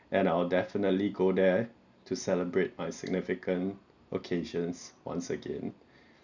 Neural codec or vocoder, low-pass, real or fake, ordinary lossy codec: none; 7.2 kHz; real; none